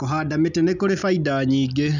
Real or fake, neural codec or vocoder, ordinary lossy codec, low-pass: real; none; none; 7.2 kHz